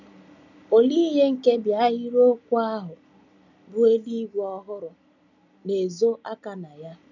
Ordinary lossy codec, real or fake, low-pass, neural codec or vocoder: none; real; 7.2 kHz; none